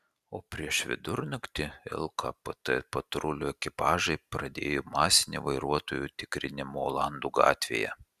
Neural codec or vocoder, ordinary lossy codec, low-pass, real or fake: none; Opus, 64 kbps; 14.4 kHz; real